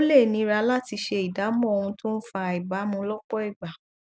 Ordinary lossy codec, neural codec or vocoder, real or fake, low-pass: none; none; real; none